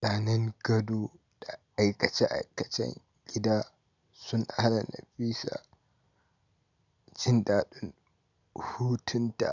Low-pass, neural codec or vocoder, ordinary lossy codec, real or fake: 7.2 kHz; none; none; real